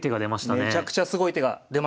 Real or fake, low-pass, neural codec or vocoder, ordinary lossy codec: real; none; none; none